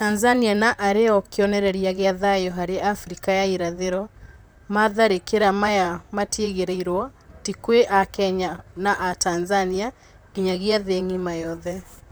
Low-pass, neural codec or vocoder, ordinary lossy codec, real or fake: none; vocoder, 44.1 kHz, 128 mel bands, Pupu-Vocoder; none; fake